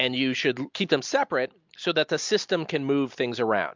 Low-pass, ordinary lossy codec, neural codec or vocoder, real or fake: 7.2 kHz; MP3, 64 kbps; none; real